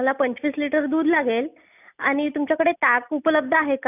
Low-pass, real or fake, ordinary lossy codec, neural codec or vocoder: 3.6 kHz; real; none; none